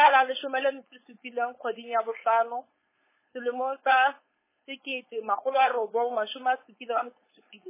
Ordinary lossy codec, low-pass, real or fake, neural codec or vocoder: MP3, 16 kbps; 3.6 kHz; fake; codec, 16 kHz, 4.8 kbps, FACodec